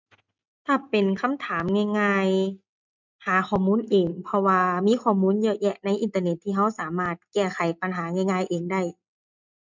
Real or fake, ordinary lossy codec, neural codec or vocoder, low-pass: real; MP3, 64 kbps; none; 7.2 kHz